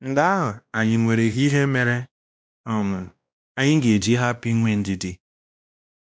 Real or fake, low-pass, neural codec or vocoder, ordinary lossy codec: fake; none; codec, 16 kHz, 1 kbps, X-Codec, WavLM features, trained on Multilingual LibriSpeech; none